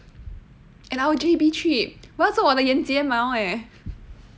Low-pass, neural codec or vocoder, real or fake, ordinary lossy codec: none; none; real; none